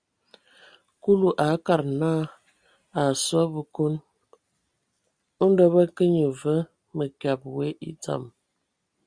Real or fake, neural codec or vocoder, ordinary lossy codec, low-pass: real; none; Opus, 64 kbps; 9.9 kHz